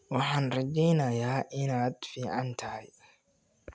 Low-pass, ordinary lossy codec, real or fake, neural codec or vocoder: none; none; real; none